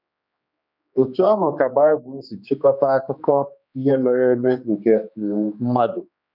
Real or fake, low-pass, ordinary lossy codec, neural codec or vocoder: fake; 5.4 kHz; none; codec, 16 kHz, 2 kbps, X-Codec, HuBERT features, trained on general audio